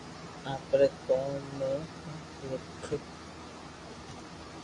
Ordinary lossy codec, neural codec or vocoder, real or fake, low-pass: AAC, 32 kbps; none; real; 10.8 kHz